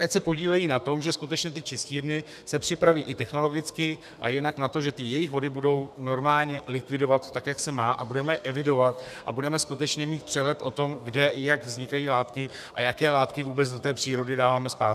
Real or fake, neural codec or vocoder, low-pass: fake; codec, 32 kHz, 1.9 kbps, SNAC; 14.4 kHz